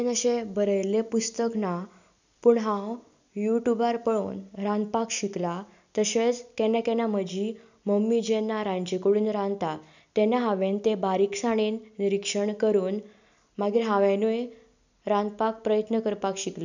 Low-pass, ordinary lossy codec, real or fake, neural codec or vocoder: 7.2 kHz; none; real; none